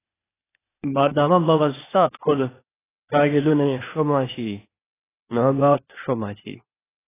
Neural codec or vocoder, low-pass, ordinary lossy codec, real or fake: codec, 16 kHz, 0.8 kbps, ZipCodec; 3.6 kHz; AAC, 16 kbps; fake